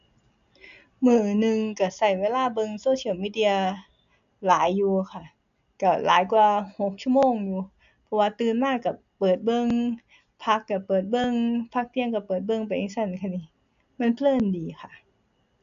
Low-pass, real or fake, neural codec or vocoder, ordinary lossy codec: 7.2 kHz; real; none; none